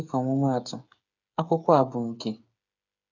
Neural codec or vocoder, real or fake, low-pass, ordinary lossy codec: codec, 16 kHz, 8 kbps, FreqCodec, smaller model; fake; 7.2 kHz; none